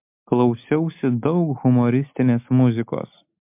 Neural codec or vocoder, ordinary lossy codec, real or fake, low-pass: none; MP3, 32 kbps; real; 3.6 kHz